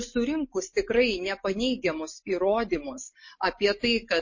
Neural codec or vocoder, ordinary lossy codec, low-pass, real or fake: none; MP3, 32 kbps; 7.2 kHz; real